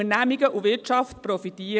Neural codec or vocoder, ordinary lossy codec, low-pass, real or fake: none; none; none; real